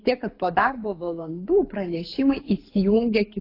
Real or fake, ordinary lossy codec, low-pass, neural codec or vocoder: fake; AAC, 24 kbps; 5.4 kHz; codec, 24 kHz, 6 kbps, HILCodec